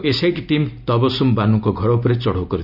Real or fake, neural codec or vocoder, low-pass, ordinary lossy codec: real; none; 5.4 kHz; none